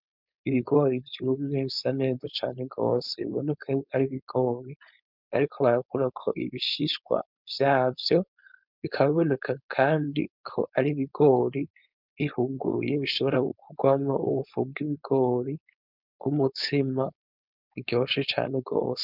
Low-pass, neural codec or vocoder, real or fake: 5.4 kHz; codec, 16 kHz, 4.8 kbps, FACodec; fake